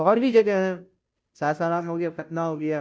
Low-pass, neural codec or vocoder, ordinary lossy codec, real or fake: none; codec, 16 kHz, 0.5 kbps, FunCodec, trained on Chinese and English, 25 frames a second; none; fake